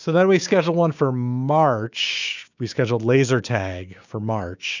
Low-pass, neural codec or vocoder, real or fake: 7.2 kHz; codec, 16 kHz, 6 kbps, DAC; fake